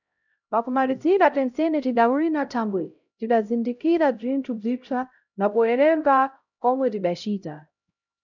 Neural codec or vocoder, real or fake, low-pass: codec, 16 kHz, 0.5 kbps, X-Codec, HuBERT features, trained on LibriSpeech; fake; 7.2 kHz